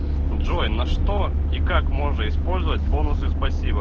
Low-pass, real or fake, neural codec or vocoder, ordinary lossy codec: 7.2 kHz; real; none; Opus, 16 kbps